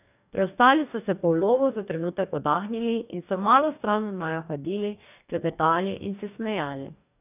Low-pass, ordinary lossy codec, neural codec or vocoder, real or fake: 3.6 kHz; none; codec, 44.1 kHz, 2.6 kbps, DAC; fake